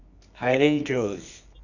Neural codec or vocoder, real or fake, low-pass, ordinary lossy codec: codec, 24 kHz, 0.9 kbps, WavTokenizer, medium music audio release; fake; 7.2 kHz; none